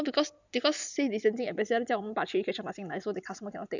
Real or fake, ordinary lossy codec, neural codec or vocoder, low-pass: real; none; none; 7.2 kHz